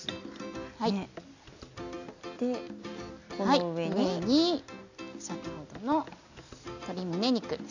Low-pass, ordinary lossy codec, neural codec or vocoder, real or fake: 7.2 kHz; none; none; real